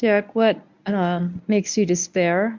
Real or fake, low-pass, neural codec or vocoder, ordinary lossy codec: fake; 7.2 kHz; codec, 24 kHz, 0.9 kbps, WavTokenizer, medium speech release version 1; MP3, 64 kbps